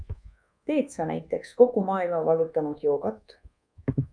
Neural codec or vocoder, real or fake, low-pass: codec, 24 kHz, 1.2 kbps, DualCodec; fake; 9.9 kHz